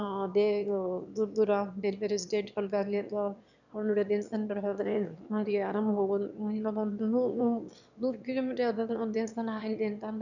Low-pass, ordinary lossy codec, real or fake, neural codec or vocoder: 7.2 kHz; none; fake; autoencoder, 22.05 kHz, a latent of 192 numbers a frame, VITS, trained on one speaker